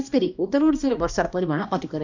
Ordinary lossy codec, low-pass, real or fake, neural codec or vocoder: none; 7.2 kHz; fake; codec, 16 kHz, 1 kbps, X-Codec, HuBERT features, trained on balanced general audio